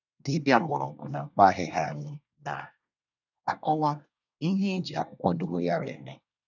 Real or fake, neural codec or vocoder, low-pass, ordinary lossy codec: fake; codec, 24 kHz, 1 kbps, SNAC; 7.2 kHz; none